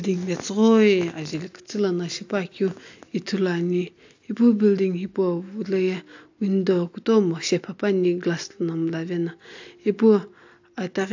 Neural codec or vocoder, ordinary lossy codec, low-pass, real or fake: none; AAC, 48 kbps; 7.2 kHz; real